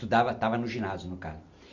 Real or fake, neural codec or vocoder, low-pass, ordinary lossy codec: real; none; 7.2 kHz; none